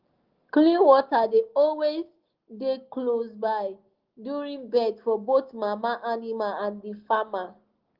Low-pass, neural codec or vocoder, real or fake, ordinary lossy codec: 5.4 kHz; none; real; Opus, 16 kbps